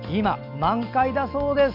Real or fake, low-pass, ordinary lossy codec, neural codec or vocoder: real; 5.4 kHz; none; none